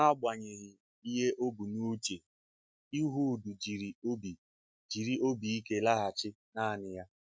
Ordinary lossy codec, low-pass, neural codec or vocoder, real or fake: none; none; none; real